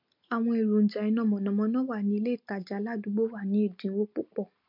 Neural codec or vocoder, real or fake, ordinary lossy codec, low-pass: none; real; MP3, 48 kbps; 5.4 kHz